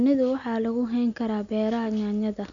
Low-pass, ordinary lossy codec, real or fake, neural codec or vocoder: 7.2 kHz; none; real; none